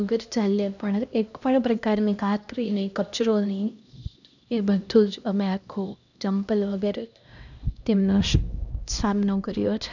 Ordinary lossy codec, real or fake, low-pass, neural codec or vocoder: none; fake; 7.2 kHz; codec, 16 kHz, 1 kbps, X-Codec, HuBERT features, trained on LibriSpeech